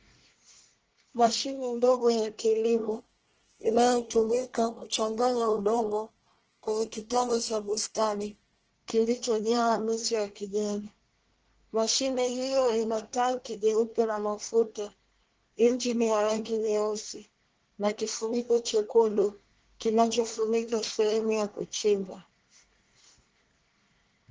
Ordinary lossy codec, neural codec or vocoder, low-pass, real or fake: Opus, 16 kbps; codec, 24 kHz, 1 kbps, SNAC; 7.2 kHz; fake